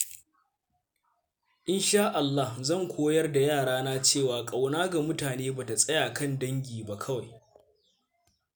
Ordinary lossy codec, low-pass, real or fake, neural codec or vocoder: none; none; real; none